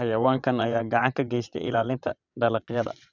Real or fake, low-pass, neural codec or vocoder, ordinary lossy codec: fake; 7.2 kHz; vocoder, 22.05 kHz, 80 mel bands, WaveNeXt; none